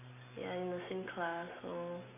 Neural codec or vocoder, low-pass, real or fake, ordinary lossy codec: none; 3.6 kHz; real; none